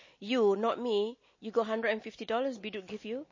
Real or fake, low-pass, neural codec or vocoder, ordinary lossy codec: real; 7.2 kHz; none; MP3, 32 kbps